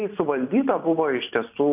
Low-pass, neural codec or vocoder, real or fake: 3.6 kHz; vocoder, 44.1 kHz, 128 mel bands every 256 samples, BigVGAN v2; fake